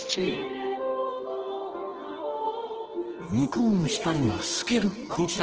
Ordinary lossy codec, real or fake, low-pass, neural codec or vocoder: Opus, 16 kbps; fake; 7.2 kHz; codec, 24 kHz, 0.9 kbps, WavTokenizer, medium music audio release